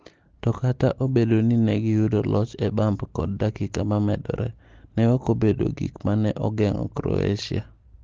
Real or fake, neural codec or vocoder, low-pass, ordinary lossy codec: real; none; 7.2 kHz; Opus, 16 kbps